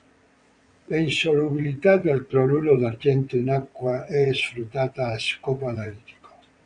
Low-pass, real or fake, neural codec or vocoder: 9.9 kHz; fake; vocoder, 22.05 kHz, 80 mel bands, WaveNeXt